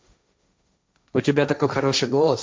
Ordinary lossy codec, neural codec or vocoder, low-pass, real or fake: none; codec, 16 kHz, 1.1 kbps, Voila-Tokenizer; none; fake